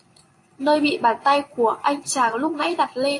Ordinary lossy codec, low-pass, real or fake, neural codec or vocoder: AAC, 48 kbps; 10.8 kHz; fake; vocoder, 24 kHz, 100 mel bands, Vocos